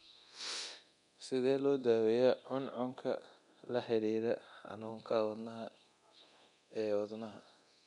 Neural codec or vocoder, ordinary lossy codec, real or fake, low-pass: codec, 24 kHz, 0.9 kbps, DualCodec; none; fake; 10.8 kHz